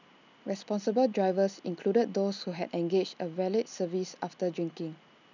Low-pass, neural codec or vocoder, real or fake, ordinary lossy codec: 7.2 kHz; none; real; none